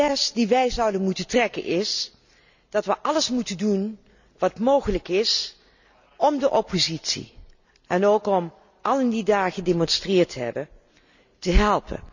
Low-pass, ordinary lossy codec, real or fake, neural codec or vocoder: 7.2 kHz; none; real; none